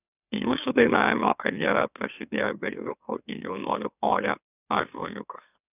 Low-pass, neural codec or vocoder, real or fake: 3.6 kHz; autoencoder, 44.1 kHz, a latent of 192 numbers a frame, MeloTTS; fake